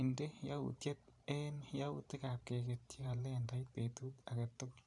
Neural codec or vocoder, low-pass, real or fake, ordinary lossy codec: vocoder, 44.1 kHz, 128 mel bands every 512 samples, BigVGAN v2; 10.8 kHz; fake; none